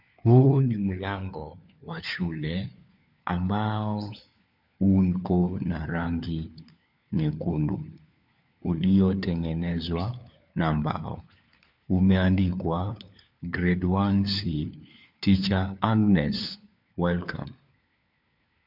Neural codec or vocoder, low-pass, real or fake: codec, 16 kHz, 4 kbps, FunCodec, trained on LibriTTS, 50 frames a second; 5.4 kHz; fake